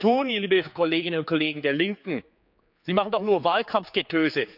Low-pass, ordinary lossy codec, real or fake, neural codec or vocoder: 5.4 kHz; none; fake; codec, 16 kHz, 4 kbps, X-Codec, HuBERT features, trained on general audio